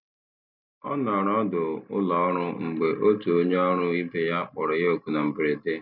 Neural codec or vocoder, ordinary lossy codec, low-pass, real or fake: none; none; 5.4 kHz; real